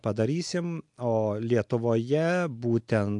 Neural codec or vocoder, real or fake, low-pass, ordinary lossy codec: none; real; 10.8 kHz; MP3, 64 kbps